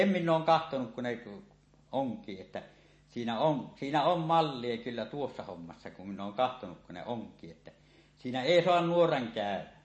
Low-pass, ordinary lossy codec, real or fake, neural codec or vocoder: 9.9 kHz; MP3, 32 kbps; real; none